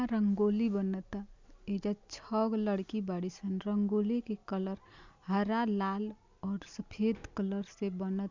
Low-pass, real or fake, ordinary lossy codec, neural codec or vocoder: 7.2 kHz; real; MP3, 64 kbps; none